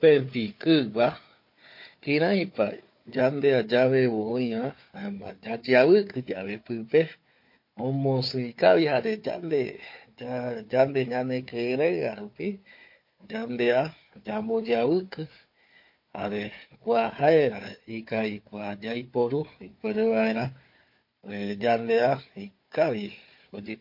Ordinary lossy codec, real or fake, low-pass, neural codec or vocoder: MP3, 32 kbps; fake; 5.4 kHz; codec, 16 kHz, 4 kbps, FunCodec, trained on Chinese and English, 50 frames a second